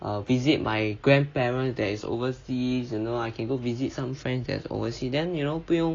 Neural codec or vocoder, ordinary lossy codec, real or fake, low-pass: none; AAC, 32 kbps; real; 7.2 kHz